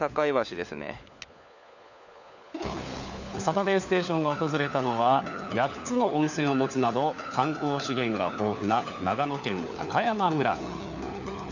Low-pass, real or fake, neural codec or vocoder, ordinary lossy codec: 7.2 kHz; fake; codec, 16 kHz, 4 kbps, FunCodec, trained on LibriTTS, 50 frames a second; none